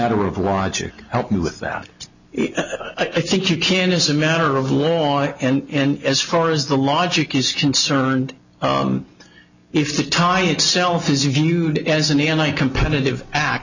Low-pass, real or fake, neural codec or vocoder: 7.2 kHz; real; none